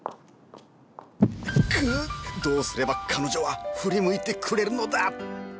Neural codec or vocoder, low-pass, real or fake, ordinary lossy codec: none; none; real; none